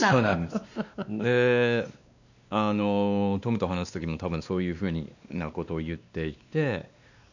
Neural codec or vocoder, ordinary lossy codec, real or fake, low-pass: codec, 16 kHz, 2 kbps, X-Codec, WavLM features, trained on Multilingual LibriSpeech; none; fake; 7.2 kHz